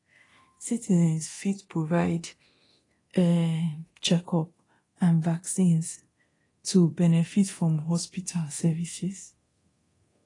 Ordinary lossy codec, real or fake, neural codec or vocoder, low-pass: AAC, 32 kbps; fake; codec, 24 kHz, 0.9 kbps, DualCodec; 10.8 kHz